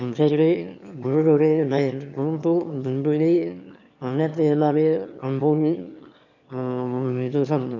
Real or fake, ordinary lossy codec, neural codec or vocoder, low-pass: fake; none; autoencoder, 22.05 kHz, a latent of 192 numbers a frame, VITS, trained on one speaker; 7.2 kHz